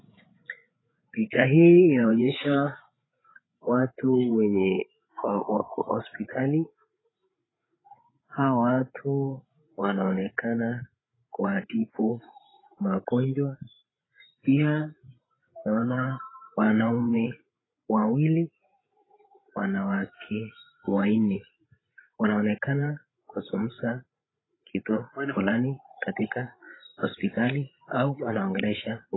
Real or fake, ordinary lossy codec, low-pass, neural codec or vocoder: fake; AAC, 16 kbps; 7.2 kHz; codec, 16 kHz, 8 kbps, FreqCodec, larger model